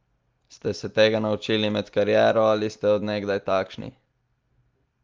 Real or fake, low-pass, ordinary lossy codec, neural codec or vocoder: real; 7.2 kHz; Opus, 24 kbps; none